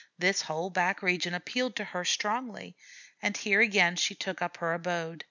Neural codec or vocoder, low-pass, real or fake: none; 7.2 kHz; real